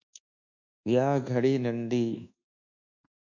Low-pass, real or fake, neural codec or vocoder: 7.2 kHz; fake; codec, 24 kHz, 1.2 kbps, DualCodec